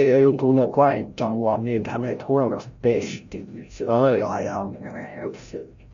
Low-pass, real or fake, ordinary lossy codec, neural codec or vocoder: 7.2 kHz; fake; MP3, 64 kbps; codec, 16 kHz, 0.5 kbps, FreqCodec, larger model